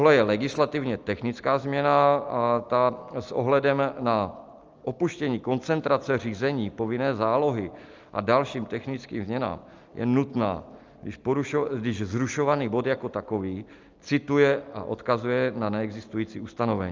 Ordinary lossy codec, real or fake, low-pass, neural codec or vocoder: Opus, 24 kbps; real; 7.2 kHz; none